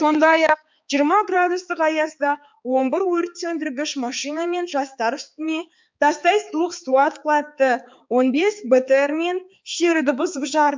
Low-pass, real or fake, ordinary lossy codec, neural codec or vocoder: 7.2 kHz; fake; MP3, 64 kbps; codec, 16 kHz, 4 kbps, X-Codec, HuBERT features, trained on balanced general audio